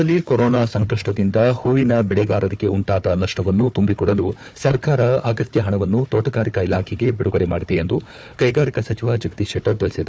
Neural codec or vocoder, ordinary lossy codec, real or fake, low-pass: codec, 16 kHz, 4 kbps, FunCodec, trained on Chinese and English, 50 frames a second; none; fake; none